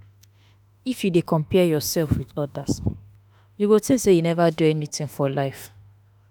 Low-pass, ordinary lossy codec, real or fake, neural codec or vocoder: none; none; fake; autoencoder, 48 kHz, 32 numbers a frame, DAC-VAE, trained on Japanese speech